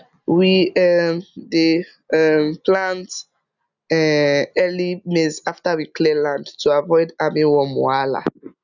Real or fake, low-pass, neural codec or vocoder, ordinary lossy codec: real; 7.2 kHz; none; none